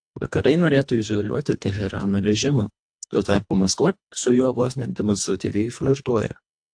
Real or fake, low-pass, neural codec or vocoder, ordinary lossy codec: fake; 9.9 kHz; codec, 24 kHz, 1.5 kbps, HILCodec; AAC, 64 kbps